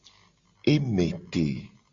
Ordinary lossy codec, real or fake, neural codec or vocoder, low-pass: Opus, 64 kbps; real; none; 7.2 kHz